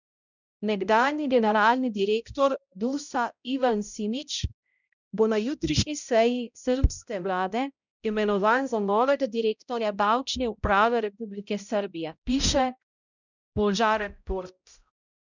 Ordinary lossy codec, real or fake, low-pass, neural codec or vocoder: none; fake; 7.2 kHz; codec, 16 kHz, 0.5 kbps, X-Codec, HuBERT features, trained on balanced general audio